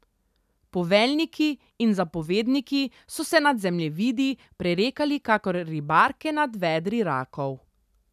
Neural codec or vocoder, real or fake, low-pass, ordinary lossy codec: none; real; 14.4 kHz; none